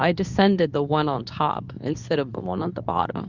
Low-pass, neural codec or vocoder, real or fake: 7.2 kHz; codec, 24 kHz, 0.9 kbps, WavTokenizer, medium speech release version 2; fake